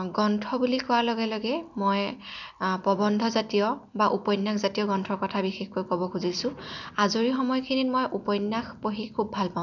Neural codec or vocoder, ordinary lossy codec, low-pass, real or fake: none; none; 7.2 kHz; real